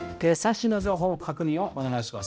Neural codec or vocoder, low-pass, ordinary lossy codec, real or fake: codec, 16 kHz, 0.5 kbps, X-Codec, HuBERT features, trained on balanced general audio; none; none; fake